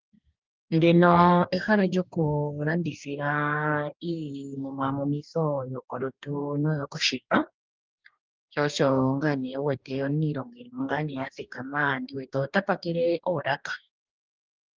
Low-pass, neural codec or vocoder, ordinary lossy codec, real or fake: 7.2 kHz; codec, 44.1 kHz, 2.6 kbps, DAC; Opus, 24 kbps; fake